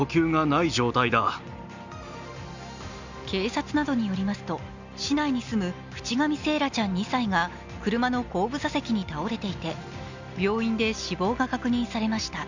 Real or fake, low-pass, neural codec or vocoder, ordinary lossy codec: real; 7.2 kHz; none; Opus, 64 kbps